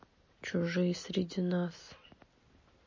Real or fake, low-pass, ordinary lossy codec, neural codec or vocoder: real; 7.2 kHz; MP3, 32 kbps; none